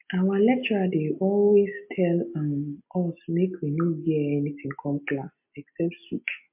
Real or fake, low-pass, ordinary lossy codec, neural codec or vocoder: real; 3.6 kHz; MP3, 32 kbps; none